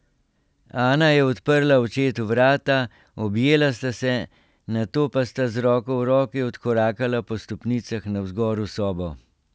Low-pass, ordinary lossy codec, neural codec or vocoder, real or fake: none; none; none; real